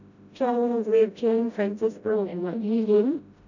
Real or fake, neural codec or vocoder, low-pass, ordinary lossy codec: fake; codec, 16 kHz, 0.5 kbps, FreqCodec, smaller model; 7.2 kHz; none